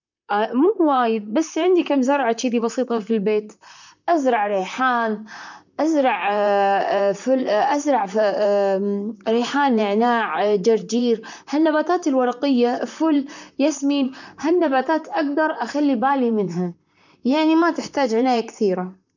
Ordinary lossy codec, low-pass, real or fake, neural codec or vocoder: none; 7.2 kHz; fake; vocoder, 44.1 kHz, 128 mel bands, Pupu-Vocoder